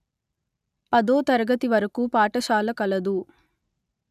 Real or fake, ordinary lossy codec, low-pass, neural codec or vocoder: real; none; 14.4 kHz; none